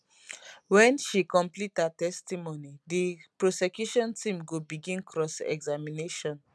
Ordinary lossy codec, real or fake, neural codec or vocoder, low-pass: none; real; none; none